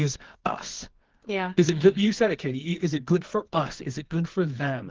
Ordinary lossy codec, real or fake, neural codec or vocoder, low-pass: Opus, 16 kbps; fake; codec, 24 kHz, 0.9 kbps, WavTokenizer, medium music audio release; 7.2 kHz